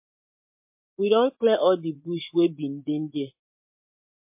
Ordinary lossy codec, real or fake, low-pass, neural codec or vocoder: MP3, 32 kbps; fake; 3.6 kHz; vocoder, 24 kHz, 100 mel bands, Vocos